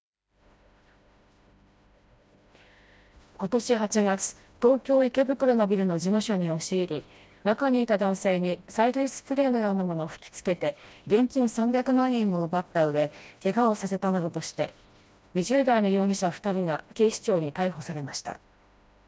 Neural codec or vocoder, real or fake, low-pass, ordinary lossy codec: codec, 16 kHz, 1 kbps, FreqCodec, smaller model; fake; none; none